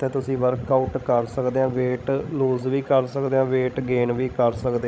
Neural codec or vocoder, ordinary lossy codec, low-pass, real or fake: codec, 16 kHz, 16 kbps, FreqCodec, larger model; none; none; fake